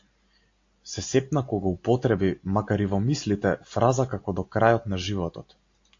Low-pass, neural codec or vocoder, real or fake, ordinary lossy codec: 7.2 kHz; none; real; AAC, 48 kbps